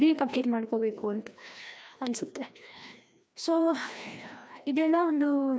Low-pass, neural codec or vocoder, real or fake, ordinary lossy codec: none; codec, 16 kHz, 1 kbps, FreqCodec, larger model; fake; none